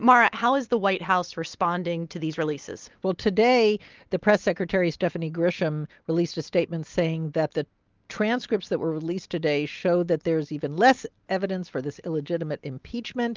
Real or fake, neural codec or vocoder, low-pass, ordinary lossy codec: real; none; 7.2 kHz; Opus, 32 kbps